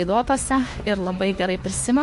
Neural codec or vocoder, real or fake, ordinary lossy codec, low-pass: autoencoder, 48 kHz, 32 numbers a frame, DAC-VAE, trained on Japanese speech; fake; MP3, 48 kbps; 14.4 kHz